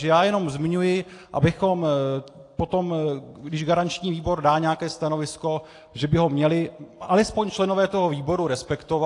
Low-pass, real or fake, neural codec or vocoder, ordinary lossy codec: 10.8 kHz; real; none; AAC, 48 kbps